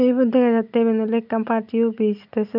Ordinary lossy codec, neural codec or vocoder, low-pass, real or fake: none; none; 5.4 kHz; real